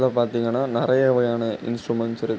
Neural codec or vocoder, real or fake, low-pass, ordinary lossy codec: none; real; none; none